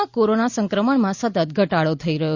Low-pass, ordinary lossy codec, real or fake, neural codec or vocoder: 7.2 kHz; Opus, 64 kbps; real; none